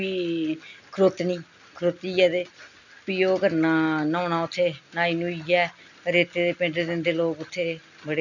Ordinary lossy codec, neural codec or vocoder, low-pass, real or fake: none; none; 7.2 kHz; real